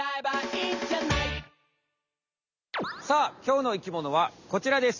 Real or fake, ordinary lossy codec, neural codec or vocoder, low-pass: real; none; none; 7.2 kHz